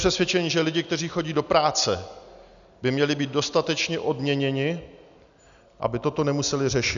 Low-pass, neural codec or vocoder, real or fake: 7.2 kHz; none; real